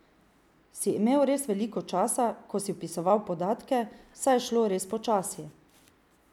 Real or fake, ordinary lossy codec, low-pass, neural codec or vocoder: real; none; 19.8 kHz; none